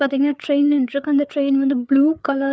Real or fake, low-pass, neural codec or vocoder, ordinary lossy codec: fake; none; codec, 16 kHz, 4 kbps, FreqCodec, larger model; none